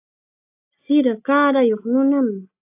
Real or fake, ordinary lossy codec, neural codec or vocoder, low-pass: real; AAC, 32 kbps; none; 3.6 kHz